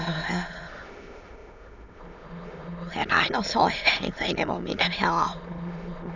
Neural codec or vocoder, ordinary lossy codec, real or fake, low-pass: autoencoder, 22.05 kHz, a latent of 192 numbers a frame, VITS, trained on many speakers; none; fake; 7.2 kHz